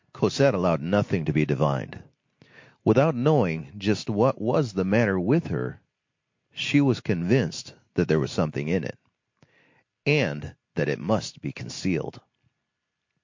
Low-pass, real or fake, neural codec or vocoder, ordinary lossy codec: 7.2 kHz; real; none; MP3, 48 kbps